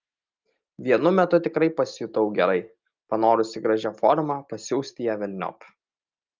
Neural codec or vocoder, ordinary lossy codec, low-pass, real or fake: none; Opus, 24 kbps; 7.2 kHz; real